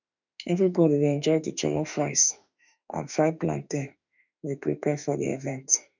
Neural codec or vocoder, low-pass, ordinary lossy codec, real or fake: autoencoder, 48 kHz, 32 numbers a frame, DAC-VAE, trained on Japanese speech; 7.2 kHz; none; fake